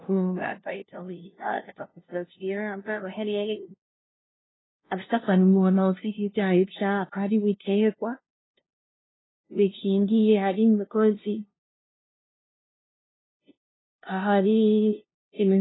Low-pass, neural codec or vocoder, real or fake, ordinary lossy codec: 7.2 kHz; codec, 16 kHz, 0.5 kbps, FunCodec, trained on LibriTTS, 25 frames a second; fake; AAC, 16 kbps